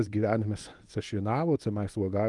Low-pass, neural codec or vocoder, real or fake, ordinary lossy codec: 10.8 kHz; codec, 24 kHz, 0.9 kbps, WavTokenizer, medium speech release version 1; fake; Opus, 32 kbps